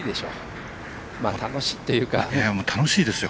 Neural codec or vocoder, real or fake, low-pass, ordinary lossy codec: none; real; none; none